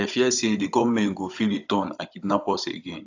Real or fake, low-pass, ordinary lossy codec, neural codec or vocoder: fake; 7.2 kHz; AAC, 48 kbps; codec, 16 kHz, 16 kbps, FunCodec, trained on Chinese and English, 50 frames a second